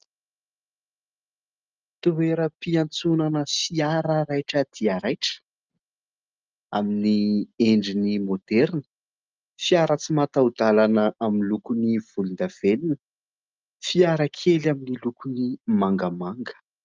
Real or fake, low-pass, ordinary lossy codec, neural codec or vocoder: real; 7.2 kHz; Opus, 24 kbps; none